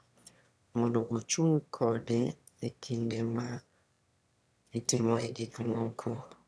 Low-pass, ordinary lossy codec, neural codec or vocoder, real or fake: none; none; autoencoder, 22.05 kHz, a latent of 192 numbers a frame, VITS, trained on one speaker; fake